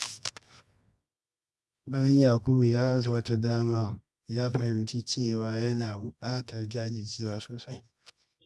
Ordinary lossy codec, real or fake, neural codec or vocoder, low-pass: none; fake; codec, 24 kHz, 0.9 kbps, WavTokenizer, medium music audio release; none